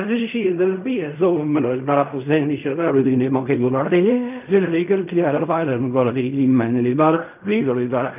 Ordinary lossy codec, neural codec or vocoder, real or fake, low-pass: none; codec, 16 kHz in and 24 kHz out, 0.4 kbps, LongCat-Audio-Codec, fine tuned four codebook decoder; fake; 3.6 kHz